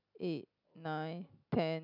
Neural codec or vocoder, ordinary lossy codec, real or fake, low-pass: none; none; real; 5.4 kHz